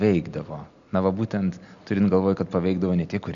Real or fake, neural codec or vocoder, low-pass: real; none; 7.2 kHz